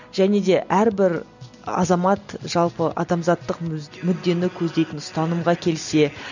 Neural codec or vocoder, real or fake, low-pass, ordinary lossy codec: none; real; 7.2 kHz; none